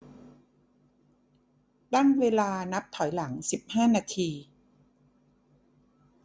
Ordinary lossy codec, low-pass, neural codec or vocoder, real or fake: none; none; none; real